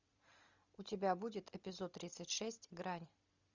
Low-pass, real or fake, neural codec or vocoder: 7.2 kHz; real; none